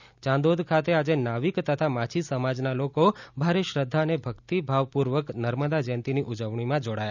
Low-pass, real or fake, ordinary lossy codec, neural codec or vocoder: 7.2 kHz; real; none; none